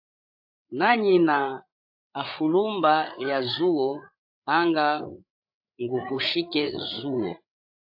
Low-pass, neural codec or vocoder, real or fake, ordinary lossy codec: 5.4 kHz; codec, 16 kHz, 8 kbps, FreqCodec, larger model; fake; AAC, 48 kbps